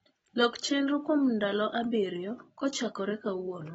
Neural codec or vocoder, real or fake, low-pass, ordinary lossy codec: none; real; 10.8 kHz; AAC, 24 kbps